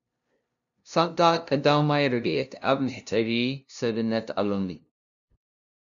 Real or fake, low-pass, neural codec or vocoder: fake; 7.2 kHz; codec, 16 kHz, 0.5 kbps, FunCodec, trained on LibriTTS, 25 frames a second